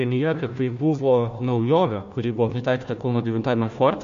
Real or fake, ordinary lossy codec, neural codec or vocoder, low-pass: fake; MP3, 48 kbps; codec, 16 kHz, 1 kbps, FunCodec, trained on Chinese and English, 50 frames a second; 7.2 kHz